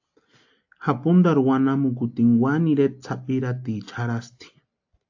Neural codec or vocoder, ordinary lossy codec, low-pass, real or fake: none; AAC, 48 kbps; 7.2 kHz; real